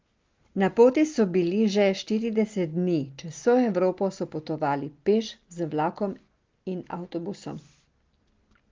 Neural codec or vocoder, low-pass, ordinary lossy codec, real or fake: none; 7.2 kHz; Opus, 32 kbps; real